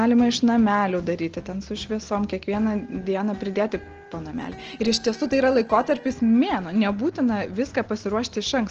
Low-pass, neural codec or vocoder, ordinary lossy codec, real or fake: 7.2 kHz; none; Opus, 16 kbps; real